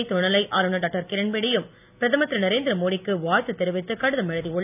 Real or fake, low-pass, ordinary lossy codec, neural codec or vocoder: real; 3.6 kHz; none; none